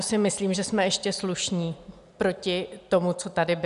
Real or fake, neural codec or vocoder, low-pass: real; none; 10.8 kHz